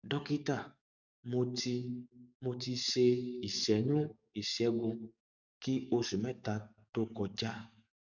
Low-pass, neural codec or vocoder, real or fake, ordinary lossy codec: 7.2 kHz; codec, 44.1 kHz, 7.8 kbps, DAC; fake; none